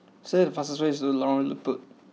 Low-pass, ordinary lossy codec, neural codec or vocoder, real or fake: none; none; none; real